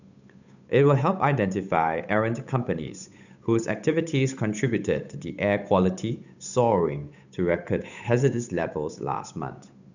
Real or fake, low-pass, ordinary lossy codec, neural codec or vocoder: fake; 7.2 kHz; none; codec, 16 kHz, 8 kbps, FunCodec, trained on Chinese and English, 25 frames a second